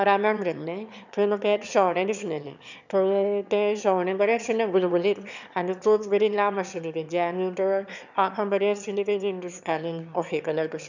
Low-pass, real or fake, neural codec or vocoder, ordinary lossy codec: 7.2 kHz; fake; autoencoder, 22.05 kHz, a latent of 192 numbers a frame, VITS, trained on one speaker; none